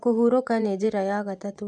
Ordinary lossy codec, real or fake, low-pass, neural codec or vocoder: none; fake; none; vocoder, 24 kHz, 100 mel bands, Vocos